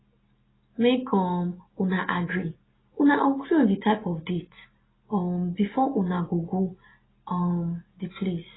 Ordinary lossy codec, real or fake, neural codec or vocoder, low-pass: AAC, 16 kbps; real; none; 7.2 kHz